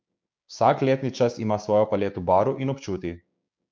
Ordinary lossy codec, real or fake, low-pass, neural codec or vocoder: none; fake; none; codec, 16 kHz, 6 kbps, DAC